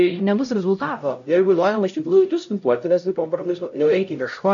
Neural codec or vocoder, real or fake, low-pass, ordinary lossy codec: codec, 16 kHz, 0.5 kbps, X-Codec, HuBERT features, trained on LibriSpeech; fake; 7.2 kHz; AAC, 64 kbps